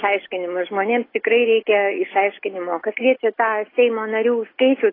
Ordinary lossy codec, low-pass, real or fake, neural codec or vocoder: AAC, 24 kbps; 5.4 kHz; real; none